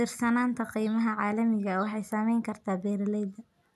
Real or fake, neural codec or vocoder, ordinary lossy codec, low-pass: real; none; none; 14.4 kHz